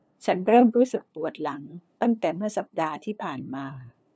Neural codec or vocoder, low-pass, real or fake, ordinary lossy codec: codec, 16 kHz, 2 kbps, FunCodec, trained on LibriTTS, 25 frames a second; none; fake; none